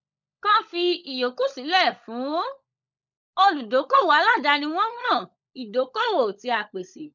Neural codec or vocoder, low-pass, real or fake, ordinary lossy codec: codec, 16 kHz, 16 kbps, FunCodec, trained on LibriTTS, 50 frames a second; 7.2 kHz; fake; none